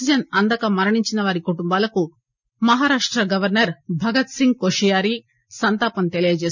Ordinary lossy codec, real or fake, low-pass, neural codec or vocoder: none; real; 7.2 kHz; none